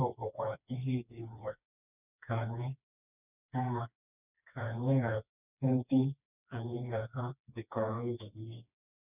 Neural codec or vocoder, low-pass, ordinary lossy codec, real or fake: codec, 16 kHz, 2 kbps, FreqCodec, smaller model; 3.6 kHz; none; fake